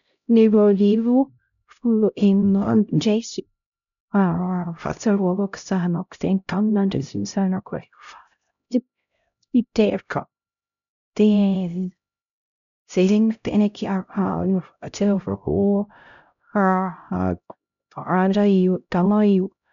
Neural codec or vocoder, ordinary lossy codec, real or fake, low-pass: codec, 16 kHz, 0.5 kbps, X-Codec, HuBERT features, trained on LibriSpeech; none; fake; 7.2 kHz